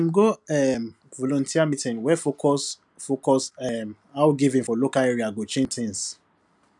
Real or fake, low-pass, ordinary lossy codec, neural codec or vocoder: real; 10.8 kHz; none; none